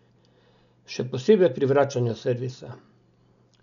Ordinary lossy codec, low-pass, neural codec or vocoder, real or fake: none; 7.2 kHz; none; real